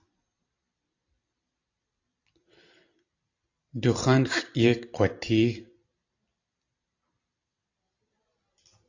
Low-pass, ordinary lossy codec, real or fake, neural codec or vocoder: 7.2 kHz; AAC, 48 kbps; fake; vocoder, 44.1 kHz, 128 mel bands every 256 samples, BigVGAN v2